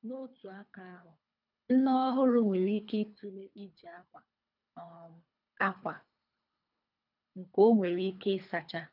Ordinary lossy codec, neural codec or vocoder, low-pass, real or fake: none; codec, 24 kHz, 3 kbps, HILCodec; 5.4 kHz; fake